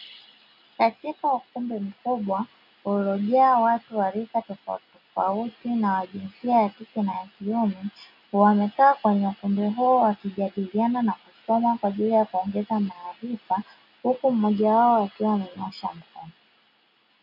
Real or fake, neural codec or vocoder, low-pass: real; none; 5.4 kHz